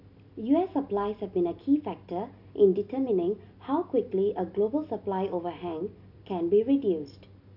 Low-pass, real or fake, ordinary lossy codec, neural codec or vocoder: 5.4 kHz; real; none; none